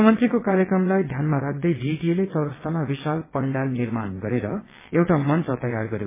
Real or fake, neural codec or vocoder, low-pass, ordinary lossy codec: fake; vocoder, 22.05 kHz, 80 mel bands, WaveNeXt; 3.6 kHz; MP3, 16 kbps